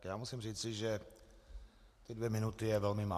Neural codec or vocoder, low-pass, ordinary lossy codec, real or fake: none; 14.4 kHz; AAC, 64 kbps; real